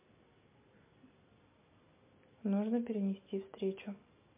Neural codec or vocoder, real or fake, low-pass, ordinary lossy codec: none; real; 3.6 kHz; MP3, 24 kbps